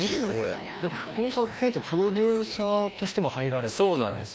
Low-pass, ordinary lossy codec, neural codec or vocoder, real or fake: none; none; codec, 16 kHz, 1 kbps, FreqCodec, larger model; fake